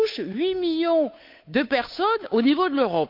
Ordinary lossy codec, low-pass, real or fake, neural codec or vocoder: none; 5.4 kHz; fake; codec, 16 kHz, 8 kbps, FunCodec, trained on Chinese and English, 25 frames a second